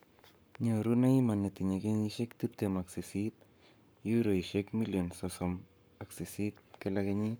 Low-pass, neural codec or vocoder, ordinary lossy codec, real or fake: none; codec, 44.1 kHz, 7.8 kbps, Pupu-Codec; none; fake